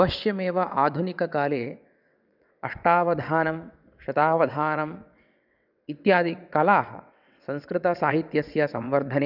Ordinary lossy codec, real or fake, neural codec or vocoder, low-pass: none; fake; vocoder, 22.05 kHz, 80 mel bands, WaveNeXt; 5.4 kHz